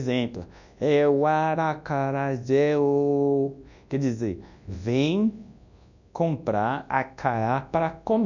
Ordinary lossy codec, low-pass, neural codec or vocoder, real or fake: none; 7.2 kHz; codec, 24 kHz, 0.9 kbps, WavTokenizer, large speech release; fake